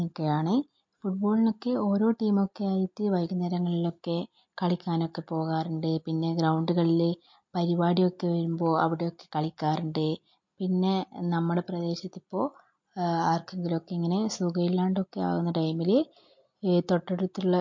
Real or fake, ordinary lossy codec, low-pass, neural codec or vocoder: real; MP3, 48 kbps; 7.2 kHz; none